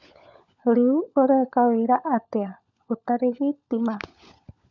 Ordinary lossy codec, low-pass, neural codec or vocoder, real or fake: none; 7.2 kHz; codec, 16 kHz, 16 kbps, FunCodec, trained on LibriTTS, 50 frames a second; fake